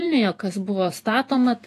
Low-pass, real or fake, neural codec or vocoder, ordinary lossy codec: 14.4 kHz; fake; vocoder, 48 kHz, 128 mel bands, Vocos; AAC, 64 kbps